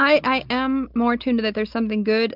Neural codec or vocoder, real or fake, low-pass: none; real; 5.4 kHz